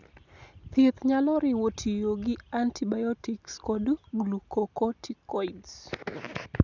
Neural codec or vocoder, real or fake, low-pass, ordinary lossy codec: none; real; 7.2 kHz; none